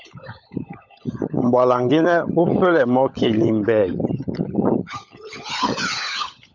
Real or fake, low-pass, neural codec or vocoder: fake; 7.2 kHz; codec, 16 kHz, 16 kbps, FunCodec, trained on LibriTTS, 50 frames a second